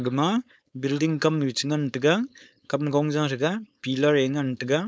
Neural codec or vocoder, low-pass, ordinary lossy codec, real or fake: codec, 16 kHz, 4.8 kbps, FACodec; none; none; fake